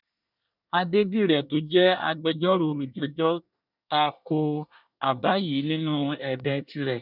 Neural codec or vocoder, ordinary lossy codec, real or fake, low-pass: codec, 24 kHz, 1 kbps, SNAC; none; fake; 5.4 kHz